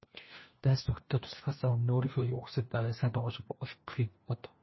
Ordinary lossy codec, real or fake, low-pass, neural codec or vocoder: MP3, 24 kbps; fake; 7.2 kHz; codec, 16 kHz, 1 kbps, FunCodec, trained on LibriTTS, 50 frames a second